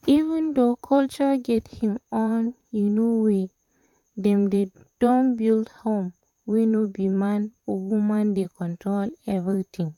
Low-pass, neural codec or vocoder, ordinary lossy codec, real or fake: 19.8 kHz; vocoder, 44.1 kHz, 128 mel bands, Pupu-Vocoder; none; fake